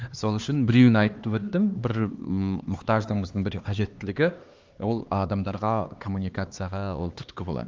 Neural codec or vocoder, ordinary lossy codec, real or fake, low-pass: codec, 16 kHz, 2 kbps, X-Codec, HuBERT features, trained on LibriSpeech; Opus, 32 kbps; fake; 7.2 kHz